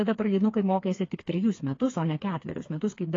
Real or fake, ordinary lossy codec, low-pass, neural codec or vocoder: fake; AAC, 32 kbps; 7.2 kHz; codec, 16 kHz, 4 kbps, FreqCodec, smaller model